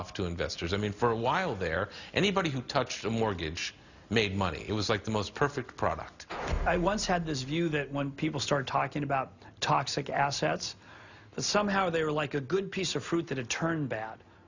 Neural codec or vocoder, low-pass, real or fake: none; 7.2 kHz; real